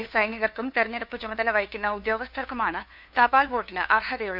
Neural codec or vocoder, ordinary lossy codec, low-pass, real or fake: codec, 16 kHz, 2 kbps, FunCodec, trained on LibriTTS, 25 frames a second; none; 5.4 kHz; fake